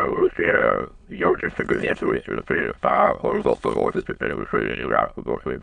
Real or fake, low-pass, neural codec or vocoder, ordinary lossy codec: fake; 9.9 kHz; autoencoder, 22.05 kHz, a latent of 192 numbers a frame, VITS, trained on many speakers; MP3, 96 kbps